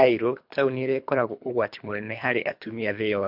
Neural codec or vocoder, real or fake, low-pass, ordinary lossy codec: codec, 24 kHz, 3 kbps, HILCodec; fake; 5.4 kHz; MP3, 48 kbps